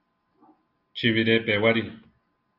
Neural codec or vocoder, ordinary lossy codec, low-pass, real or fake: none; Opus, 64 kbps; 5.4 kHz; real